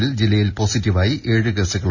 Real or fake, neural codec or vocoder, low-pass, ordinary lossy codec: real; none; 7.2 kHz; none